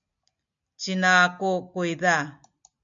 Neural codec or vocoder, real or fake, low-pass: none; real; 7.2 kHz